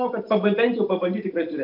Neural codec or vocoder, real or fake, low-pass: none; real; 5.4 kHz